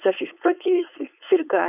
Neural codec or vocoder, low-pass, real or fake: codec, 16 kHz, 4.8 kbps, FACodec; 3.6 kHz; fake